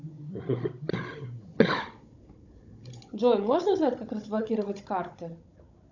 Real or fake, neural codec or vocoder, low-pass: fake; codec, 16 kHz, 16 kbps, FunCodec, trained on Chinese and English, 50 frames a second; 7.2 kHz